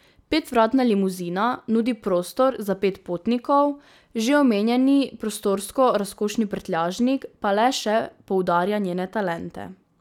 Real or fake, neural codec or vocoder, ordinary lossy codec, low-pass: real; none; none; 19.8 kHz